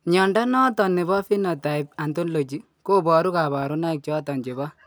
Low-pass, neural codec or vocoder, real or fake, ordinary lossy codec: none; none; real; none